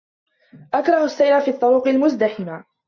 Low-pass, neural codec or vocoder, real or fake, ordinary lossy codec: 7.2 kHz; none; real; MP3, 48 kbps